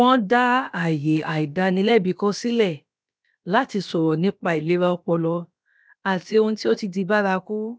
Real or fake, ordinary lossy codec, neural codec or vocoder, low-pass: fake; none; codec, 16 kHz, about 1 kbps, DyCAST, with the encoder's durations; none